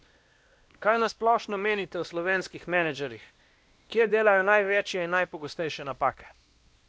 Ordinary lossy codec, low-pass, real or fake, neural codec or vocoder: none; none; fake; codec, 16 kHz, 1 kbps, X-Codec, WavLM features, trained on Multilingual LibriSpeech